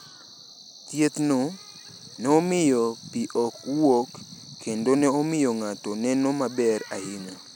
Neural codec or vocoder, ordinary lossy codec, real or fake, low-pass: none; none; real; none